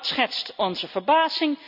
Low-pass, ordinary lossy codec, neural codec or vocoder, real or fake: 5.4 kHz; none; none; real